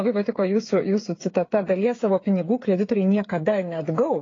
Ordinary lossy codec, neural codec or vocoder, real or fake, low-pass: AAC, 32 kbps; codec, 16 kHz, 8 kbps, FreqCodec, smaller model; fake; 7.2 kHz